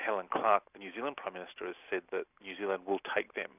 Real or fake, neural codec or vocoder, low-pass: real; none; 3.6 kHz